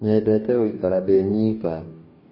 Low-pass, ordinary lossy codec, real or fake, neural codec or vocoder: 5.4 kHz; MP3, 24 kbps; fake; codec, 44.1 kHz, 2.6 kbps, DAC